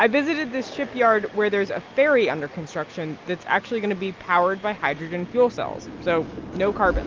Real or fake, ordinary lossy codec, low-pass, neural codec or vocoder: real; Opus, 32 kbps; 7.2 kHz; none